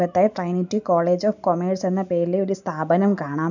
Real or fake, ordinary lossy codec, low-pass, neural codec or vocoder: real; none; 7.2 kHz; none